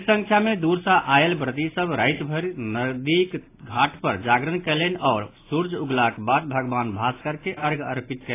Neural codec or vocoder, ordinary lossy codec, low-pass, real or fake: none; AAC, 24 kbps; 3.6 kHz; real